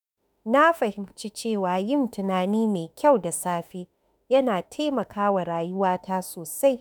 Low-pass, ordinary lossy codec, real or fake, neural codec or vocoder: none; none; fake; autoencoder, 48 kHz, 32 numbers a frame, DAC-VAE, trained on Japanese speech